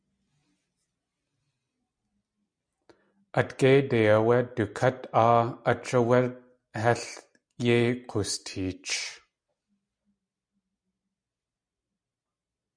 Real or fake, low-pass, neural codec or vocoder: real; 9.9 kHz; none